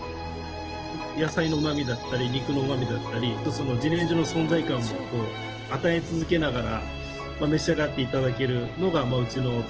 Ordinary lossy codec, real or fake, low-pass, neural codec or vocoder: Opus, 16 kbps; real; 7.2 kHz; none